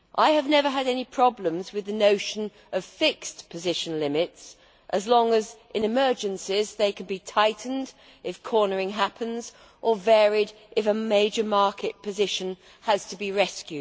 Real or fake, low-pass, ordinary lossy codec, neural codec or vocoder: real; none; none; none